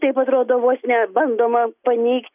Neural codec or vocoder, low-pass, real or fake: none; 3.6 kHz; real